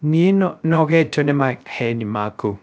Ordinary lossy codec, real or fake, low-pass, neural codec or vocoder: none; fake; none; codec, 16 kHz, 0.3 kbps, FocalCodec